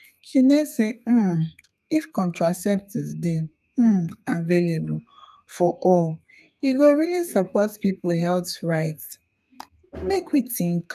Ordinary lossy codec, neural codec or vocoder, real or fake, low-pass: none; codec, 32 kHz, 1.9 kbps, SNAC; fake; 14.4 kHz